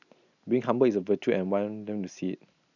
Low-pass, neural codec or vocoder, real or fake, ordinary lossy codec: 7.2 kHz; none; real; none